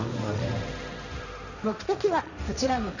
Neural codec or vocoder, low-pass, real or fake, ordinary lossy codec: codec, 16 kHz, 1.1 kbps, Voila-Tokenizer; 7.2 kHz; fake; none